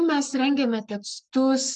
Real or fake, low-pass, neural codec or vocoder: fake; 10.8 kHz; codec, 44.1 kHz, 7.8 kbps, Pupu-Codec